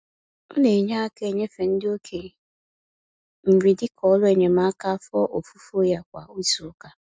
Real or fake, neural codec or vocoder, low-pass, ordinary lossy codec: real; none; none; none